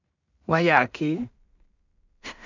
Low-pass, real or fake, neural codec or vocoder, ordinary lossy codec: 7.2 kHz; fake; codec, 16 kHz in and 24 kHz out, 0.4 kbps, LongCat-Audio-Codec, two codebook decoder; none